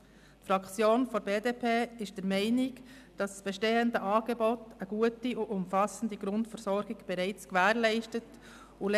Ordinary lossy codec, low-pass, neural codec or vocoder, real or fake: none; 14.4 kHz; none; real